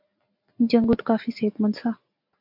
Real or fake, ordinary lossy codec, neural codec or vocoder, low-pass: real; MP3, 48 kbps; none; 5.4 kHz